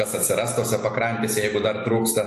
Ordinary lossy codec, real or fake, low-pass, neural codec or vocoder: AAC, 64 kbps; real; 14.4 kHz; none